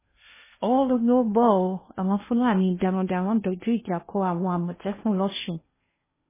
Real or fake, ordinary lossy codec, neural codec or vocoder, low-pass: fake; MP3, 16 kbps; codec, 16 kHz in and 24 kHz out, 0.8 kbps, FocalCodec, streaming, 65536 codes; 3.6 kHz